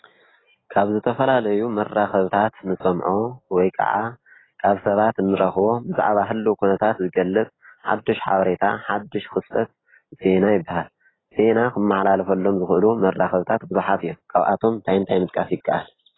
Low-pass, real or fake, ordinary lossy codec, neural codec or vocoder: 7.2 kHz; real; AAC, 16 kbps; none